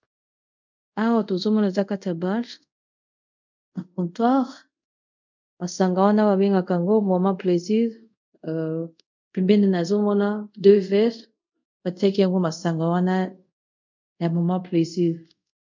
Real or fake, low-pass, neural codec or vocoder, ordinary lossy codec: fake; 7.2 kHz; codec, 24 kHz, 0.5 kbps, DualCodec; MP3, 64 kbps